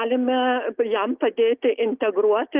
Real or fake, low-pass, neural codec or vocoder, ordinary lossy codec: real; 3.6 kHz; none; Opus, 24 kbps